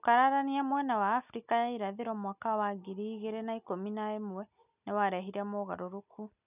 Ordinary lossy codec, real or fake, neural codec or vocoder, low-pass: none; real; none; 3.6 kHz